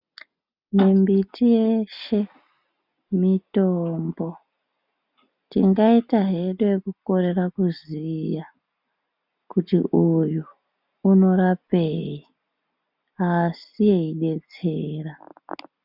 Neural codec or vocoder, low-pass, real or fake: none; 5.4 kHz; real